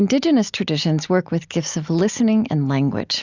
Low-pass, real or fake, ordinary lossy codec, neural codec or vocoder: 7.2 kHz; real; Opus, 64 kbps; none